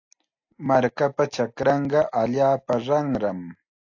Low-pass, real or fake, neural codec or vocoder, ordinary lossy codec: 7.2 kHz; real; none; AAC, 48 kbps